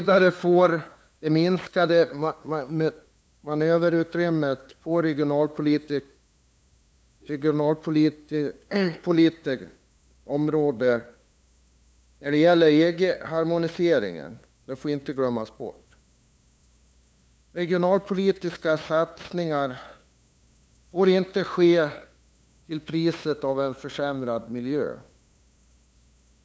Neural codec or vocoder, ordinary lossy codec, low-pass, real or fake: codec, 16 kHz, 2 kbps, FunCodec, trained on LibriTTS, 25 frames a second; none; none; fake